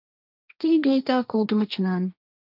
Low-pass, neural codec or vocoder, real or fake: 5.4 kHz; codec, 16 kHz, 1.1 kbps, Voila-Tokenizer; fake